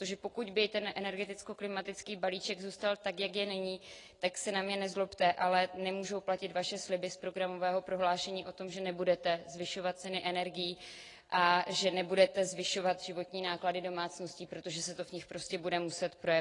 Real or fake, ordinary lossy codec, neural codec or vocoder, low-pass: real; AAC, 32 kbps; none; 10.8 kHz